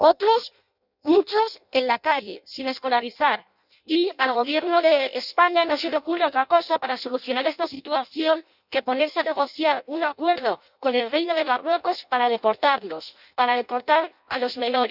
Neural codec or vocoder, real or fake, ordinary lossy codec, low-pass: codec, 16 kHz in and 24 kHz out, 0.6 kbps, FireRedTTS-2 codec; fake; none; 5.4 kHz